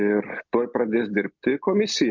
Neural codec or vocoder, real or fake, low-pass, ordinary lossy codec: none; real; 7.2 kHz; MP3, 64 kbps